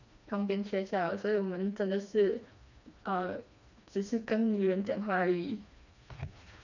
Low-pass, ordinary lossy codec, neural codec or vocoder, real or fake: 7.2 kHz; none; codec, 16 kHz, 2 kbps, FreqCodec, smaller model; fake